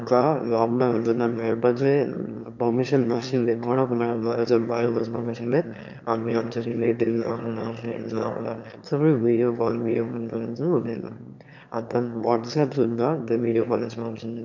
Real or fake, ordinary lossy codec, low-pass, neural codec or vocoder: fake; none; 7.2 kHz; autoencoder, 22.05 kHz, a latent of 192 numbers a frame, VITS, trained on one speaker